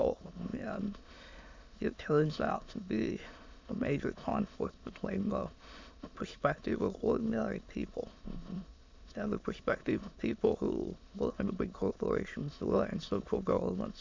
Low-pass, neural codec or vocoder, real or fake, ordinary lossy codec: 7.2 kHz; autoencoder, 22.05 kHz, a latent of 192 numbers a frame, VITS, trained on many speakers; fake; MP3, 48 kbps